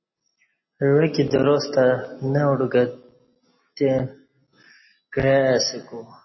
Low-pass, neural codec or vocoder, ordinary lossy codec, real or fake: 7.2 kHz; none; MP3, 24 kbps; real